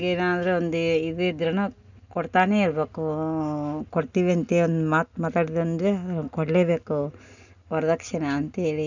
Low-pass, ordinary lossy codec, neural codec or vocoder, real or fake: 7.2 kHz; none; none; real